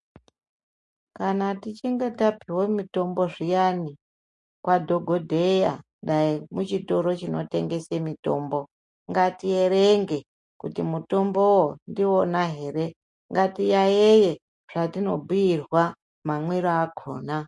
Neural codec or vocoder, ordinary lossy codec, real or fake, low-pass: none; MP3, 48 kbps; real; 10.8 kHz